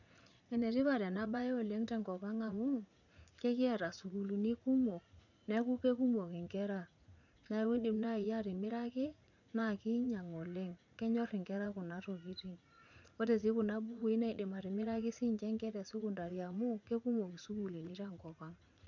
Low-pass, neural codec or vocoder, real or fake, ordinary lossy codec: 7.2 kHz; vocoder, 44.1 kHz, 80 mel bands, Vocos; fake; none